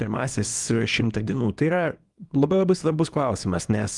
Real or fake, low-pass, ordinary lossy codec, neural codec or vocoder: fake; 10.8 kHz; Opus, 32 kbps; codec, 24 kHz, 0.9 kbps, WavTokenizer, small release